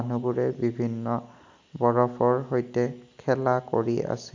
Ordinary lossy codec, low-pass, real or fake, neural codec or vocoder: MP3, 64 kbps; 7.2 kHz; real; none